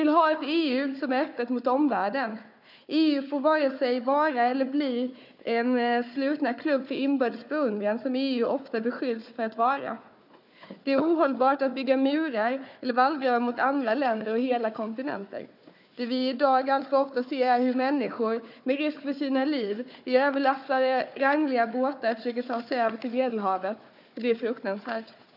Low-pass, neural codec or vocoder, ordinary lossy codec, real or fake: 5.4 kHz; codec, 16 kHz, 4 kbps, FunCodec, trained on Chinese and English, 50 frames a second; none; fake